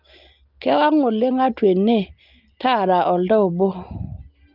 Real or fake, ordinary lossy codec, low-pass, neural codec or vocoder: real; Opus, 32 kbps; 5.4 kHz; none